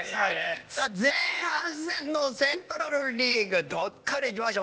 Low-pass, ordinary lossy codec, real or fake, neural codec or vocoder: none; none; fake; codec, 16 kHz, 0.8 kbps, ZipCodec